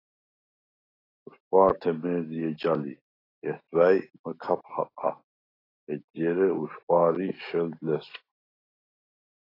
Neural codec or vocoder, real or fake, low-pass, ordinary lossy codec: none; real; 5.4 kHz; AAC, 24 kbps